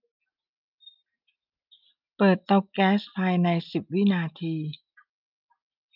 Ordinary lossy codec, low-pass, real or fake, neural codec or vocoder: none; 5.4 kHz; real; none